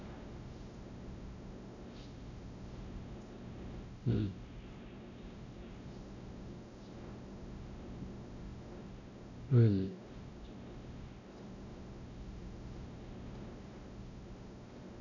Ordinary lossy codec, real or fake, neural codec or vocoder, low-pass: none; fake; codec, 16 kHz, 0.5 kbps, X-Codec, WavLM features, trained on Multilingual LibriSpeech; 7.2 kHz